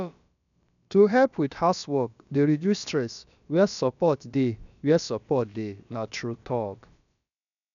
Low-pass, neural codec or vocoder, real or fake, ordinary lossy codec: 7.2 kHz; codec, 16 kHz, about 1 kbps, DyCAST, with the encoder's durations; fake; none